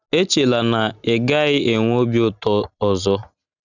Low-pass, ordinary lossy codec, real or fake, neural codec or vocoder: 7.2 kHz; none; real; none